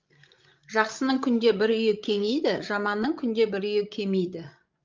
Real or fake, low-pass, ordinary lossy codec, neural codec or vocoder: fake; 7.2 kHz; Opus, 24 kbps; codec, 16 kHz, 16 kbps, FreqCodec, larger model